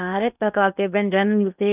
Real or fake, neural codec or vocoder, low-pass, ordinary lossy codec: fake; codec, 16 kHz in and 24 kHz out, 0.6 kbps, FocalCodec, streaming, 2048 codes; 3.6 kHz; none